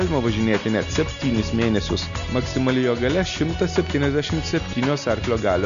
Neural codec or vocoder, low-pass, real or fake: none; 7.2 kHz; real